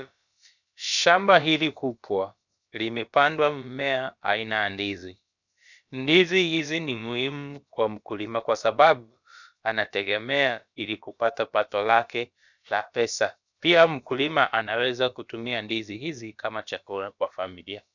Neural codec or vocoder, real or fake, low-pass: codec, 16 kHz, about 1 kbps, DyCAST, with the encoder's durations; fake; 7.2 kHz